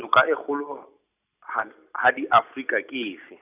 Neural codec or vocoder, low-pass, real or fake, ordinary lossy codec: none; 3.6 kHz; real; none